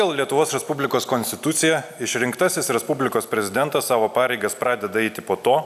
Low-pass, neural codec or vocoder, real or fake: 14.4 kHz; none; real